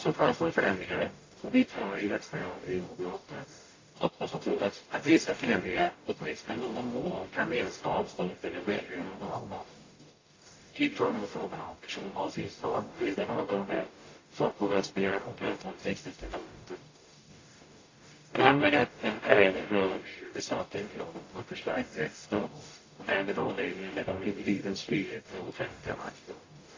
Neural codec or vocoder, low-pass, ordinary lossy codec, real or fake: codec, 44.1 kHz, 0.9 kbps, DAC; 7.2 kHz; AAC, 32 kbps; fake